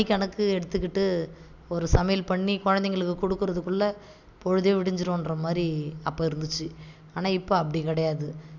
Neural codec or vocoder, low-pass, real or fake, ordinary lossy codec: none; 7.2 kHz; real; none